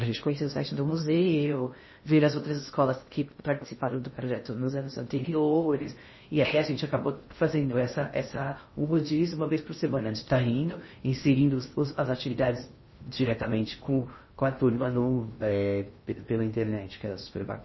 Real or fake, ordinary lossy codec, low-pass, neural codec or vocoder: fake; MP3, 24 kbps; 7.2 kHz; codec, 16 kHz in and 24 kHz out, 0.6 kbps, FocalCodec, streaming, 2048 codes